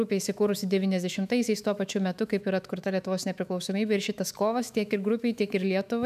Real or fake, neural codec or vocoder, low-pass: fake; autoencoder, 48 kHz, 128 numbers a frame, DAC-VAE, trained on Japanese speech; 14.4 kHz